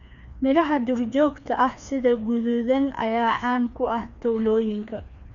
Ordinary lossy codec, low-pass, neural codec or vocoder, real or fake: none; 7.2 kHz; codec, 16 kHz, 2 kbps, FreqCodec, larger model; fake